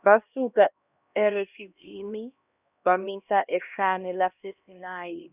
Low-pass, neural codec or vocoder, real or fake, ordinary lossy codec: 3.6 kHz; codec, 16 kHz, 1 kbps, X-Codec, HuBERT features, trained on LibriSpeech; fake; none